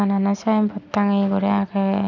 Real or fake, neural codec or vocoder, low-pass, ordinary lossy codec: real; none; 7.2 kHz; none